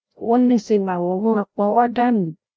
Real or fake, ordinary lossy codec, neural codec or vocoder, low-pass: fake; none; codec, 16 kHz, 0.5 kbps, FreqCodec, larger model; none